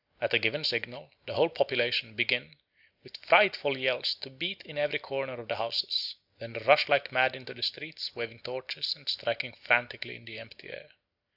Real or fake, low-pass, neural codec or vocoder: real; 5.4 kHz; none